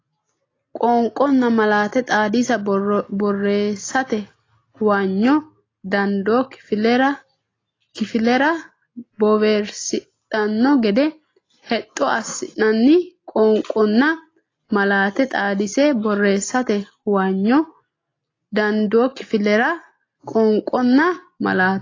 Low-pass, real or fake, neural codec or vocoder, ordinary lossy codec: 7.2 kHz; real; none; AAC, 32 kbps